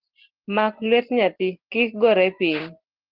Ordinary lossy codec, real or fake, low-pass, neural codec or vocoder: Opus, 16 kbps; real; 5.4 kHz; none